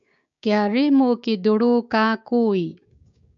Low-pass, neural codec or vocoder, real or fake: 7.2 kHz; codec, 16 kHz, 6 kbps, DAC; fake